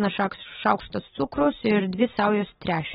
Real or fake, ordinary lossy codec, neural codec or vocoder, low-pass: real; AAC, 16 kbps; none; 7.2 kHz